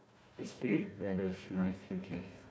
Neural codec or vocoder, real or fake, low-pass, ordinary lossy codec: codec, 16 kHz, 1 kbps, FunCodec, trained on Chinese and English, 50 frames a second; fake; none; none